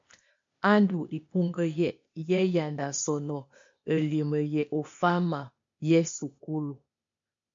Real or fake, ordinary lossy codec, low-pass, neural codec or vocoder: fake; MP3, 48 kbps; 7.2 kHz; codec, 16 kHz, 0.8 kbps, ZipCodec